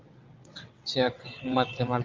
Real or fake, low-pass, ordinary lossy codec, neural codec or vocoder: fake; 7.2 kHz; Opus, 24 kbps; vocoder, 22.05 kHz, 80 mel bands, WaveNeXt